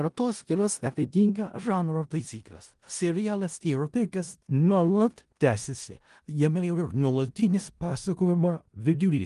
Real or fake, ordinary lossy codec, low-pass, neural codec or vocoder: fake; Opus, 24 kbps; 10.8 kHz; codec, 16 kHz in and 24 kHz out, 0.4 kbps, LongCat-Audio-Codec, four codebook decoder